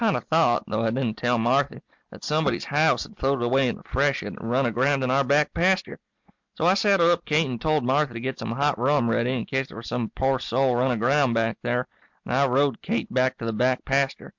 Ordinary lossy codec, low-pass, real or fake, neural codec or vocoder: MP3, 64 kbps; 7.2 kHz; real; none